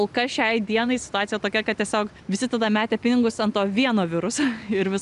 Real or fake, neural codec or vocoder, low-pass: real; none; 10.8 kHz